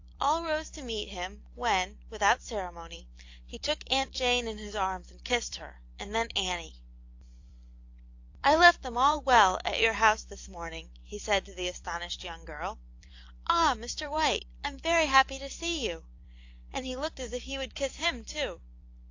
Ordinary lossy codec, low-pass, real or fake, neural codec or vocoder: AAC, 48 kbps; 7.2 kHz; fake; vocoder, 44.1 kHz, 128 mel bands every 256 samples, BigVGAN v2